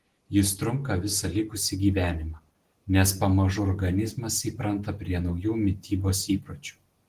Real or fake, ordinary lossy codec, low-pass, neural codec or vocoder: real; Opus, 16 kbps; 14.4 kHz; none